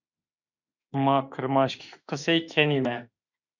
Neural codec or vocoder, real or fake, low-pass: autoencoder, 48 kHz, 32 numbers a frame, DAC-VAE, trained on Japanese speech; fake; 7.2 kHz